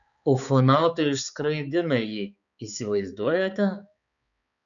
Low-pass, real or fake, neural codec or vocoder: 7.2 kHz; fake; codec, 16 kHz, 4 kbps, X-Codec, HuBERT features, trained on balanced general audio